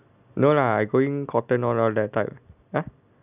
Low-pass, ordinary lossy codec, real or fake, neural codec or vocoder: 3.6 kHz; none; real; none